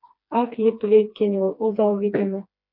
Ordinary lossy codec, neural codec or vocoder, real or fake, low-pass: MP3, 48 kbps; codec, 16 kHz, 2 kbps, FreqCodec, smaller model; fake; 5.4 kHz